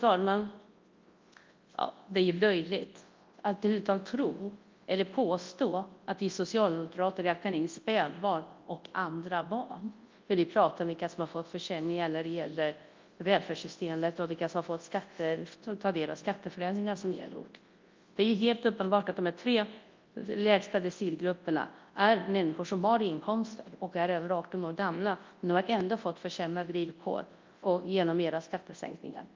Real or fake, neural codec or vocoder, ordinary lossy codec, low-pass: fake; codec, 24 kHz, 0.9 kbps, WavTokenizer, large speech release; Opus, 32 kbps; 7.2 kHz